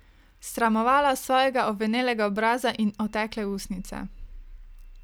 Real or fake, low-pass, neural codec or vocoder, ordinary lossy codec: real; none; none; none